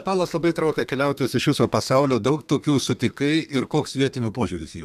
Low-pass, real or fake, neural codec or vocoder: 14.4 kHz; fake; codec, 32 kHz, 1.9 kbps, SNAC